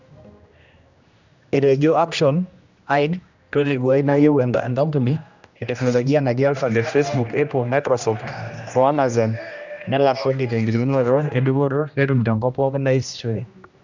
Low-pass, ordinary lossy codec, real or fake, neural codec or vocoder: 7.2 kHz; none; fake; codec, 16 kHz, 1 kbps, X-Codec, HuBERT features, trained on general audio